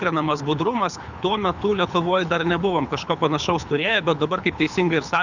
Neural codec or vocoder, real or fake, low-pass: codec, 24 kHz, 6 kbps, HILCodec; fake; 7.2 kHz